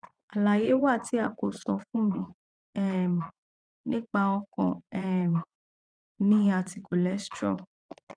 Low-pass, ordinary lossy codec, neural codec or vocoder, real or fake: 9.9 kHz; none; vocoder, 22.05 kHz, 80 mel bands, Vocos; fake